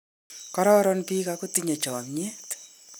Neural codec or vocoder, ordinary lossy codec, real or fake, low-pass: none; none; real; none